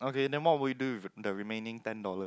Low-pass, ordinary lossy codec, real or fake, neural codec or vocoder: none; none; real; none